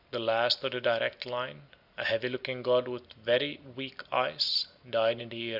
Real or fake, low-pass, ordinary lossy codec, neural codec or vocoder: real; 5.4 kHz; Opus, 64 kbps; none